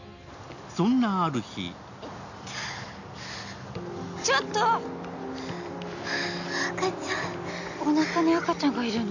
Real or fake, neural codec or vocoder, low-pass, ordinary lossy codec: real; none; 7.2 kHz; none